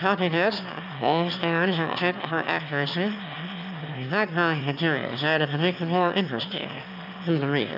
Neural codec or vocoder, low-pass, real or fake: autoencoder, 22.05 kHz, a latent of 192 numbers a frame, VITS, trained on one speaker; 5.4 kHz; fake